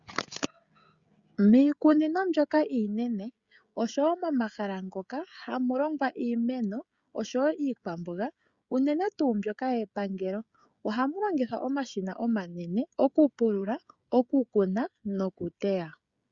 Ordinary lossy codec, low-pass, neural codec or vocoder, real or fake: Opus, 64 kbps; 7.2 kHz; codec, 16 kHz, 16 kbps, FreqCodec, smaller model; fake